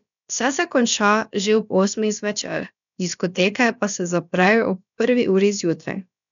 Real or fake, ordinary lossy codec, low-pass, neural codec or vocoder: fake; none; 7.2 kHz; codec, 16 kHz, about 1 kbps, DyCAST, with the encoder's durations